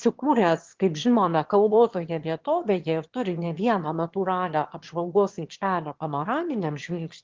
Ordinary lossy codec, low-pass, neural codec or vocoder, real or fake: Opus, 16 kbps; 7.2 kHz; autoencoder, 22.05 kHz, a latent of 192 numbers a frame, VITS, trained on one speaker; fake